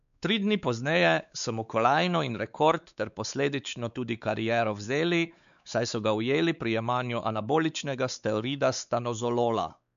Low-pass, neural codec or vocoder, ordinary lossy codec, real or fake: 7.2 kHz; codec, 16 kHz, 4 kbps, X-Codec, WavLM features, trained on Multilingual LibriSpeech; none; fake